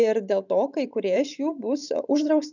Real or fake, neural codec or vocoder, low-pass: fake; vocoder, 44.1 kHz, 128 mel bands every 512 samples, BigVGAN v2; 7.2 kHz